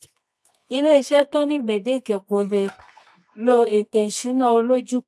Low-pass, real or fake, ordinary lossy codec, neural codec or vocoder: none; fake; none; codec, 24 kHz, 0.9 kbps, WavTokenizer, medium music audio release